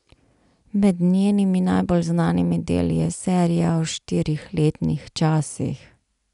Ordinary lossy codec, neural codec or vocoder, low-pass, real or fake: none; none; 10.8 kHz; real